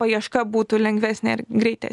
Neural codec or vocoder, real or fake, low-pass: none; real; 10.8 kHz